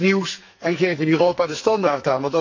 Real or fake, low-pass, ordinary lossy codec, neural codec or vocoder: fake; 7.2 kHz; MP3, 48 kbps; codec, 44.1 kHz, 2.6 kbps, SNAC